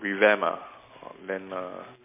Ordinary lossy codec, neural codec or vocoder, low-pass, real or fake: MP3, 24 kbps; none; 3.6 kHz; real